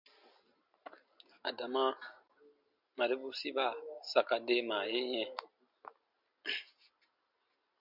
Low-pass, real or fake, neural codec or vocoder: 5.4 kHz; real; none